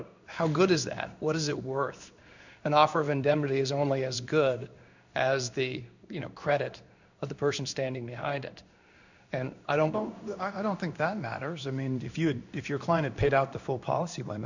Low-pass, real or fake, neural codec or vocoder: 7.2 kHz; fake; codec, 16 kHz in and 24 kHz out, 1 kbps, XY-Tokenizer